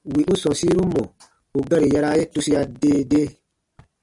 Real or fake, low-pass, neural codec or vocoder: real; 10.8 kHz; none